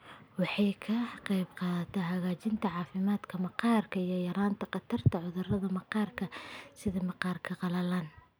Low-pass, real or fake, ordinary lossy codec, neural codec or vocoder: none; real; none; none